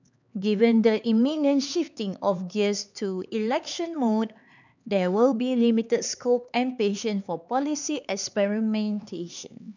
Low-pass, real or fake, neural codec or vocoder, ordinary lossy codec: 7.2 kHz; fake; codec, 16 kHz, 4 kbps, X-Codec, HuBERT features, trained on LibriSpeech; none